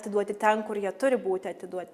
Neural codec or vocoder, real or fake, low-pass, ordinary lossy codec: none; real; 14.4 kHz; Opus, 64 kbps